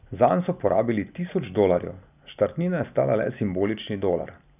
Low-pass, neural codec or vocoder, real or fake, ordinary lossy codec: 3.6 kHz; none; real; none